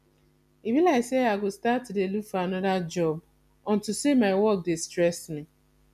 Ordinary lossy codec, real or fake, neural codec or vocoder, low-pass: none; real; none; 14.4 kHz